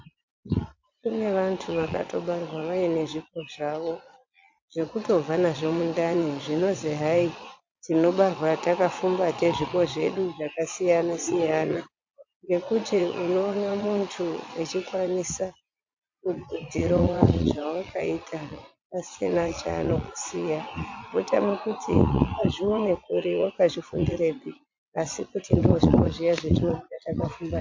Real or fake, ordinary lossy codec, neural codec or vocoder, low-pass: fake; MP3, 48 kbps; vocoder, 22.05 kHz, 80 mel bands, Vocos; 7.2 kHz